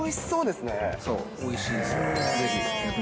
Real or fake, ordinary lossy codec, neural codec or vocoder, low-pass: real; none; none; none